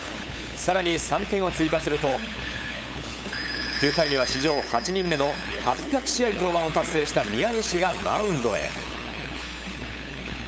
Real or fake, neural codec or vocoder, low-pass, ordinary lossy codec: fake; codec, 16 kHz, 8 kbps, FunCodec, trained on LibriTTS, 25 frames a second; none; none